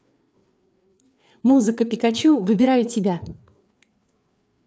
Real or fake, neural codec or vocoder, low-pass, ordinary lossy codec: fake; codec, 16 kHz, 4 kbps, FreqCodec, larger model; none; none